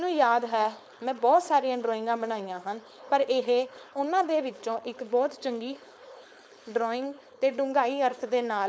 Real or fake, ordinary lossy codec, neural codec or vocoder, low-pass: fake; none; codec, 16 kHz, 4.8 kbps, FACodec; none